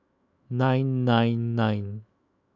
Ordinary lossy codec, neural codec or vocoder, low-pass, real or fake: none; autoencoder, 48 kHz, 128 numbers a frame, DAC-VAE, trained on Japanese speech; 7.2 kHz; fake